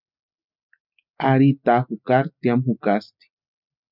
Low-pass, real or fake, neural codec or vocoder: 5.4 kHz; real; none